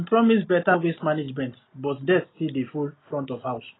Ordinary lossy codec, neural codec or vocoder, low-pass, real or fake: AAC, 16 kbps; none; 7.2 kHz; real